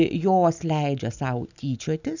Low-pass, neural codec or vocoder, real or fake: 7.2 kHz; none; real